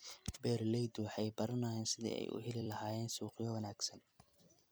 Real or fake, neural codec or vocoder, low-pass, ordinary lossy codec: fake; vocoder, 44.1 kHz, 128 mel bands every 256 samples, BigVGAN v2; none; none